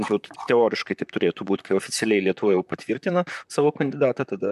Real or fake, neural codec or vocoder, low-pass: fake; vocoder, 44.1 kHz, 128 mel bands, Pupu-Vocoder; 14.4 kHz